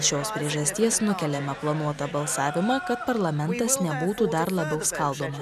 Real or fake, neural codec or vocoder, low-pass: real; none; 14.4 kHz